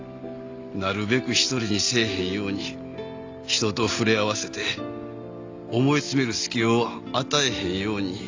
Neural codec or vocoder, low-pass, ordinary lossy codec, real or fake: none; 7.2 kHz; AAC, 48 kbps; real